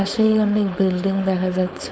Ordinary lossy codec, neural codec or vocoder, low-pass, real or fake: none; codec, 16 kHz, 4.8 kbps, FACodec; none; fake